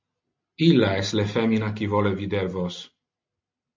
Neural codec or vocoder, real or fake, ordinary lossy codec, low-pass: none; real; MP3, 48 kbps; 7.2 kHz